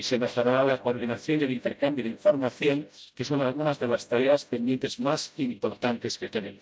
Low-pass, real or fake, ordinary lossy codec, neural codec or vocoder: none; fake; none; codec, 16 kHz, 0.5 kbps, FreqCodec, smaller model